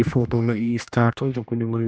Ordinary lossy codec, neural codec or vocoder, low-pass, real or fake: none; codec, 16 kHz, 1 kbps, X-Codec, HuBERT features, trained on general audio; none; fake